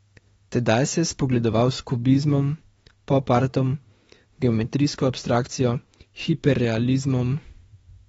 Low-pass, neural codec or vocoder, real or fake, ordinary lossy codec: 19.8 kHz; autoencoder, 48 kHz, 32 numbers a frame, DAC-VAE, trained on Japanese speech; fake; AAC, 24 kbps